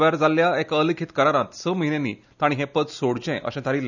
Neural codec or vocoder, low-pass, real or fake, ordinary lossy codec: none; 7.2 kHz; real; none